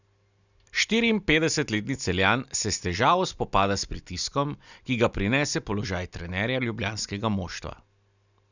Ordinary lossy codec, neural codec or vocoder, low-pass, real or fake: none; none; 7.2 kHz; real